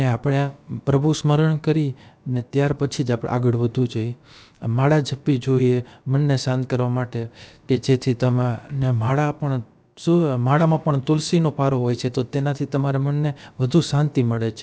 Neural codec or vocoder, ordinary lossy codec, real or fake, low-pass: codec, 16 kHz, about 1 kbps, DyCAST, with the encoder's durations; none; fake; none